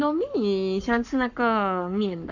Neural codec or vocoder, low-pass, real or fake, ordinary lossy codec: codec, 44.1 kHz, 7.8 kbps, Pupu-Codec; 7.2 kHz; fake; none